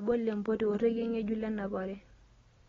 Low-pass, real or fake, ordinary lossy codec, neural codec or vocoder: 7.2 kHz; real; AAC, 24 kbps; none